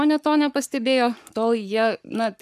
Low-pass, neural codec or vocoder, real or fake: 14.4 kHz; codec, 44.1 kHz, 7.8 kbps, Pupu-Codec; fake